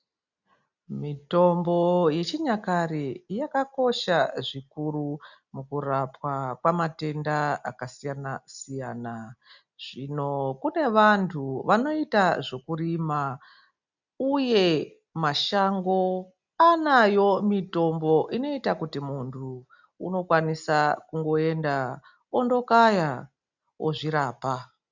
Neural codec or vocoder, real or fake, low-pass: none; real; 7.2 kHz